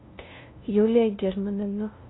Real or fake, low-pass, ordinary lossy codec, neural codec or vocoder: fake; 7.2 kHz; AAC, 16 kbps; codec, 16 kHz, 0.5 kbps, FunCodec, trained on LibriTTS, 25 frames a second